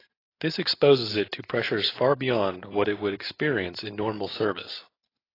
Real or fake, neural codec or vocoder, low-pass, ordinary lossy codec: real; none; 5.4 kHz; AAC, 24 kbps